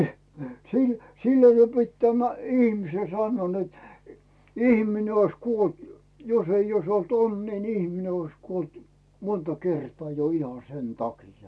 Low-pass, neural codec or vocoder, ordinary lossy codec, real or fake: none; none; none; real